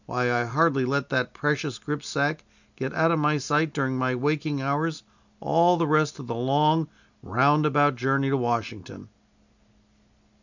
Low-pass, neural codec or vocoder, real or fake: 7.2 kHz; none; real